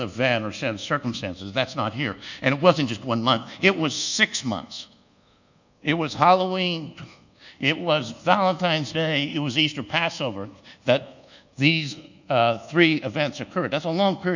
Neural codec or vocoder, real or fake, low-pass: codec, 24 kHz, 1.2 kbps, DualCodec; fake; 7.2 kHz